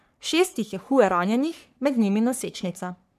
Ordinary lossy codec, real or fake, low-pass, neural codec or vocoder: none; fake; 14.4 kHz; codec, 44.1 kHz, 3.4 kbps, Pupu-Codec